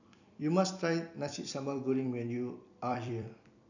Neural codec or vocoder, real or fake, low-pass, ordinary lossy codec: vocoder, 44.1 kHz, 128 mel bands every 256 samples, BigVGAN v2; fake; 7.2 kHz; none